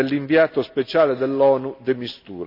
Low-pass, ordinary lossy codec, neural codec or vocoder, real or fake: 5.4 kHz; none; none; real